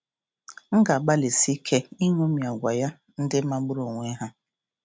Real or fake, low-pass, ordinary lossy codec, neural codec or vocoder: real; none; none; none